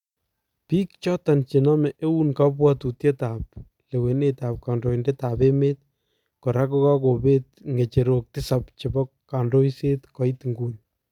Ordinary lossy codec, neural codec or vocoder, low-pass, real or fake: none; none; 19.8 kHz; real